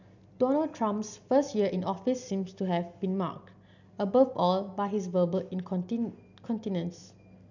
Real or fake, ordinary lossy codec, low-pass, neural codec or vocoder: real; none; 7.2 kHz; none